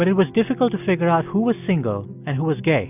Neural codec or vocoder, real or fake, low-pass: none; real; 3.6 kHz